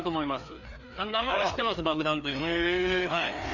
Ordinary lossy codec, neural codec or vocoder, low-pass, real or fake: none; codec, 16 kHz, 2 kbps, FreqCodec, larger model; 7.2 kHz; fake